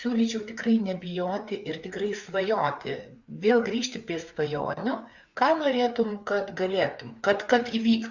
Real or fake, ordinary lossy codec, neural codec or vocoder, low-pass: fake; Opus, 64 kbps; codec, 16 kHz, 4 kbps, FreqCodec, larger model; 7.2 kHz